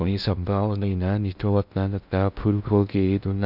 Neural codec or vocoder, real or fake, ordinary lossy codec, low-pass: codec, 16 kHz in and 24 kHz out, 0.6 kbps, FocalCodec, streaming, 2048 codes; fake; none; 5.4 kHz